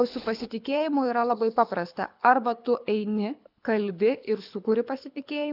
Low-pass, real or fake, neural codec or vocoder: 5.4 kHz; fake; codec, 24 kHz, 6 kbps, HILCodec